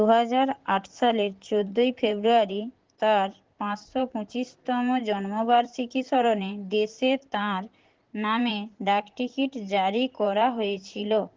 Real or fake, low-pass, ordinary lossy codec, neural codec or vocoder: fake; 7.2 kHz; Opus, 16 kbps; vocoder, 44.1 kHz, 128 mel bands, Pupu-Vocoder